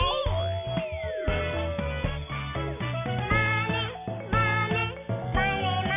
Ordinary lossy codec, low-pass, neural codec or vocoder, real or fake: MP3, 32 kbps; 3.6 kHz; none; real